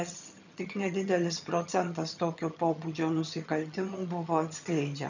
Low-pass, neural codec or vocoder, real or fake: 7.2 kHz; vocoder, 22.05 kHz, 80 mel bands, HiFi-GAN; fake